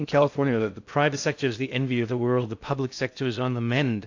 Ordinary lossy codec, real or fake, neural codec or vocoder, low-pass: AAC, 48 kbps; fake; codec, 16 kHz in and 24 kHz out, 0.6 kbps, FocalCodec, streaming, 2048 codes; 7.2 kHz